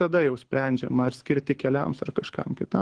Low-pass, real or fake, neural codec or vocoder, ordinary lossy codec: 9.9 kHz; fake; codec, 24 kHz, 6 kbps, HILCodec; Opus, 16 kbps